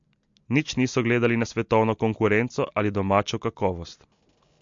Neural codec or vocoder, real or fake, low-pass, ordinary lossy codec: none; real; 7.2 kHz; MP3, 48 kbps